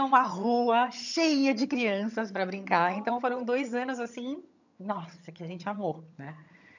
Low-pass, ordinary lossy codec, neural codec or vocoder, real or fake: 7.2 kHz; none; vocoder, 22.05 kHz, 80 mel bands, HiFi-GAN; fake